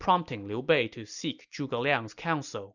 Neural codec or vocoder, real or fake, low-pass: none; real; 7.2 kHz